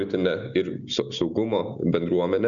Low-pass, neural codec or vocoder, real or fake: 7.2 kHz; none; real